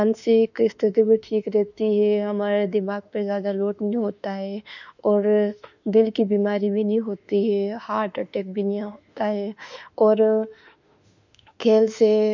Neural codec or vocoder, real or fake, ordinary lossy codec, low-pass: autoencoder, 48 kHz, 32 numbers a frame, DAC-VAE, trained on Japanese speech; fake; none; 7.2 kHz